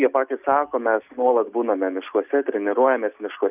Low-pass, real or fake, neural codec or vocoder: 3.6 kHz; real; none